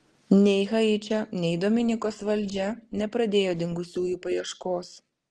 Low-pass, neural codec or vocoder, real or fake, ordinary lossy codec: 10.8 kHz; none; real; Opus, 16 kbps